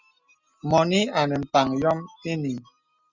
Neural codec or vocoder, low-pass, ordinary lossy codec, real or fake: none; 7.2 kHz; Opus, 64 kbps; real